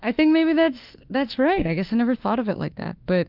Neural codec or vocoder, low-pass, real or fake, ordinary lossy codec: autoencoder, 48 kHz, 32 numbers a frame, DAC-VAE, trained on Japanese speech; 5.4 kHz; fake; Opus, 32 kbps